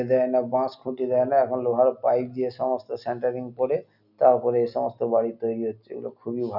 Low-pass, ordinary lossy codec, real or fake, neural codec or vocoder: 5.4 kHz; none; real; none